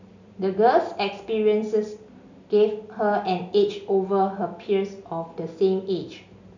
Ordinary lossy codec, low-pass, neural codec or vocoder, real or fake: none; 7.2 kHz; none; real